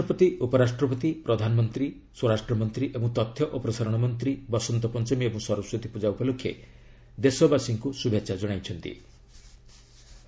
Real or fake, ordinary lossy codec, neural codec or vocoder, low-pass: real; none; none; none